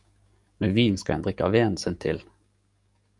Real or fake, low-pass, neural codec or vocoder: fake; 10.8 kHz; codec, 44.1 kHz, 7.8 kbps, DAC